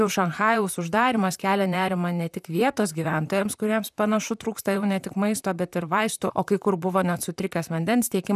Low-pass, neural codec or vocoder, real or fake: 14.4 kHz; vocoder, 44.1 kHz, 128 mel bands, Pupu-Vocoder; fake